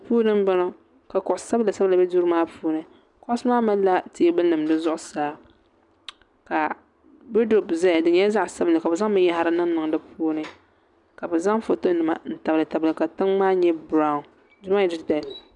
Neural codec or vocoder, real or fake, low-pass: none; real; 10.8 kHz